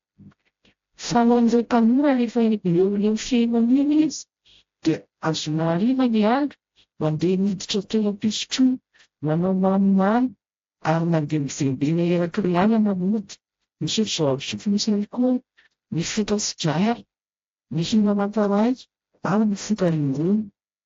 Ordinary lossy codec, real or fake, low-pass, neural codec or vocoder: AAC, 48 kbps; fake; 7.2 kHz; codec, 16 kHz, 0.5 kbps, FreqCodec, smaller model